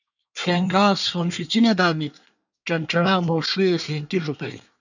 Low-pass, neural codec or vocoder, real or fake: 7.2 kHz; codec, 24 kHz, 1 kbps, SNAC; fake